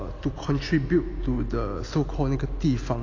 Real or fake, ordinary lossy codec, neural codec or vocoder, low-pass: real; AAC, 32 kbps; none; 7.2 kHz